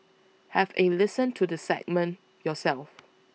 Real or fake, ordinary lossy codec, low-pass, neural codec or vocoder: real; none; none; none